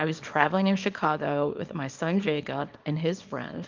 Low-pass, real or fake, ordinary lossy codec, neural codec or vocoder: 7.2 kHz; fake; Opus, 32 kbps; codec, 24 kHz, 0.9 kbps, WavTokenizer, small release